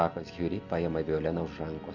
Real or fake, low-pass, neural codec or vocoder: real; 7.2 kHz; none